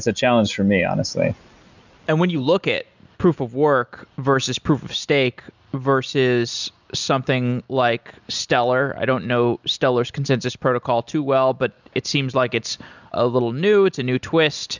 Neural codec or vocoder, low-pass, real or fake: none; 7.2 kHz; real